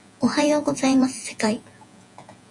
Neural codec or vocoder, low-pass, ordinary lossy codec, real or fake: vocoder, 48 kHz, 128 mel bands, Vocos; 10.8 kHz; AAC, 64 kbps; fake